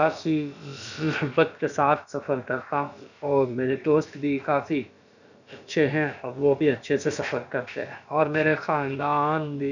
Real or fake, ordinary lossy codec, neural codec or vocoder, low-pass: fake; none; codec, 16 kHz, about 1 kbps, DyCAST, with the encoder's durations; 7.2 kHz